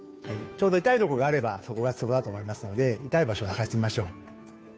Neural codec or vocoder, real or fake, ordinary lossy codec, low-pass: codec, 16 kHz, 2 kbps, FunCodec, trained on Chinese and English, 25 frames a second; fake; none; none